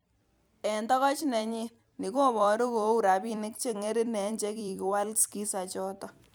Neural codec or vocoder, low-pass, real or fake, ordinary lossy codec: vocoder, 44.1 kHz, 128 mel bands every 256 samples, BigVGAN v2; none; fake; none